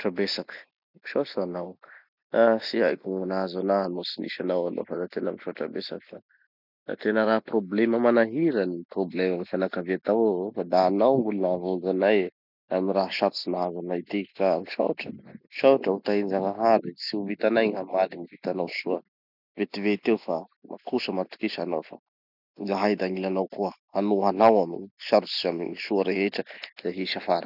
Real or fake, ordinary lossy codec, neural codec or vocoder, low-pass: real; none; none; 5.4 kHz